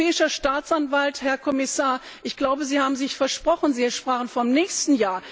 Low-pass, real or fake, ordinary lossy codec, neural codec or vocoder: none; real; none; none